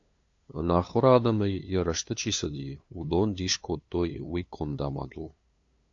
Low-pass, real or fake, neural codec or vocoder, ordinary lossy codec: 7.2 kHz; fake; codec, 16 kHz, 2 kbps, FunCodec, trained on LibriTTS, 25 frames a second; AAC, 48 kbps